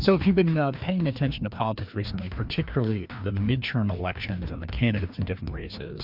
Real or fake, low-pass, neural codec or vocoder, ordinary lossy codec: fake; 5.4 kHz; codec, 16 kHz, 2 kbps, FreqCodec, larger model; MP3, 48 kbps